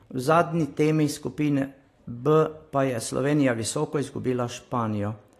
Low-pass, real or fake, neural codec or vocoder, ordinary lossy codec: 14.4 kHz; real; none; AAC, 48 kbps